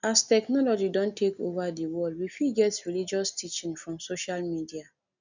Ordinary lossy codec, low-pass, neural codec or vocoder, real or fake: none; 7.2 kHz; none; real